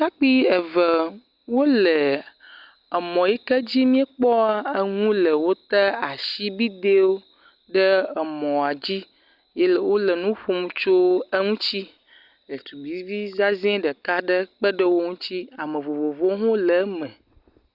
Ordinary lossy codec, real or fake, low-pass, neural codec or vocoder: Opus, 64 kbps; real; 5.4 kHz; none